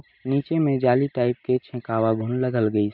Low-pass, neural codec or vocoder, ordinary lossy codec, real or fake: 5.4 kHz; none; MP3, 48 kbps; real